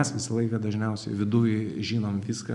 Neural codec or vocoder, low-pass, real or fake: none; 10.8 kHz; real